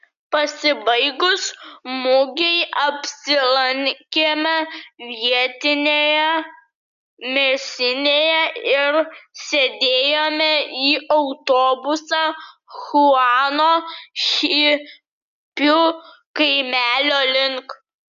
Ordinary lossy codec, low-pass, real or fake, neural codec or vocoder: MP3, 96 kbps; 7.2 kHz; real; none